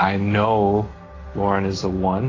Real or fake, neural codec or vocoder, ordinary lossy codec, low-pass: real; none; AAC, 32 kbps; 7.2 kHz